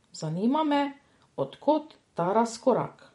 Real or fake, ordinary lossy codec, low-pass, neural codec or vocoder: fake; MP3, 48 kbps; 19.8 kHz; vocoder, 44.1 kHz, 128 mel bands every 256 samples, BigVGAN v2